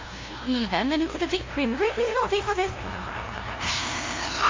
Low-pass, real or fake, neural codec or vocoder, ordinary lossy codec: 7.2 kHz; fake; codec, 16 kHz, 0.5 kbps, FunCodec, trained on LibriTTS, 25 frames a second; MP3, 32 kbps